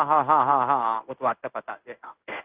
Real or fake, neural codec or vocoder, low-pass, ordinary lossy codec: fake; codec, 16 kHz in and 24 kHz out, 1 kbps, XY-Tokenizer; 3.6 kHz; Opus, 24 kbps